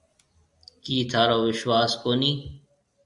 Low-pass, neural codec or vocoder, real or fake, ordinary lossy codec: 10.8 kHz; none; real; MP3, 64 kbps